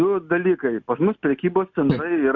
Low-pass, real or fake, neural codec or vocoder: 7.2 kHz; real; none